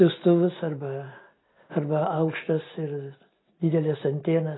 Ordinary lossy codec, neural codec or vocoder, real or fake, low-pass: AAC, 16 kbps; none; real; 7.2 kHz